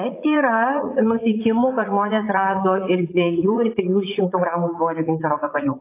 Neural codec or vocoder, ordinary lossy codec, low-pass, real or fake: vocoder, 44.1 kHz, 80 mel bands, Vocos; AAC, 24 kbps; 3.6 kHz; fake